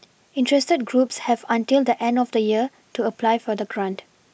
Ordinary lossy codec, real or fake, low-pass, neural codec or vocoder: none; real; none; none